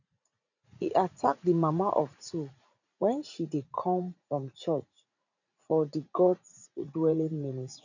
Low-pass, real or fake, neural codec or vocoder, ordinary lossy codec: 7.2 kHz; fake; vocoder, 44.1 kHz, 80 mel bands, Vocos; none